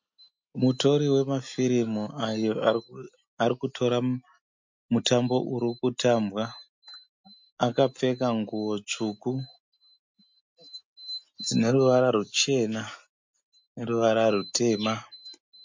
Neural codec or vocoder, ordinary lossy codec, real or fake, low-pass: none; MP3, 48 kbps; real; 7.2 kHz